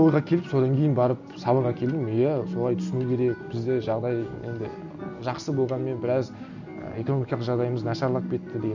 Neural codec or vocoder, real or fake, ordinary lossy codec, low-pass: none; real; none; 7.2 kHz